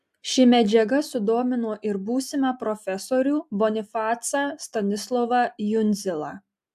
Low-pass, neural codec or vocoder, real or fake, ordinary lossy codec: 14.4 kHz; none; real; AAC, 96 kbps